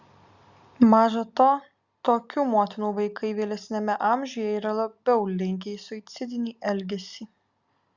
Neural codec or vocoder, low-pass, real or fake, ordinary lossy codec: none; 7.2 kHz; real; Opus, 64 kbps